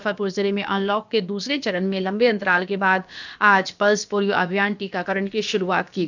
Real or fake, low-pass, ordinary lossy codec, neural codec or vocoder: fake; 7.2 kHz; none; codec, 16 kHz, about 1 kbps, DyCAST, with the encoder's durations